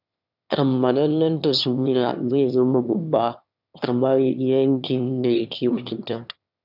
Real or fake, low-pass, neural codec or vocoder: fake; 5.4 kHz; autoencoder, 22.05 kHz, a latent of 192 numbers a frame, VITS, trained on one speaker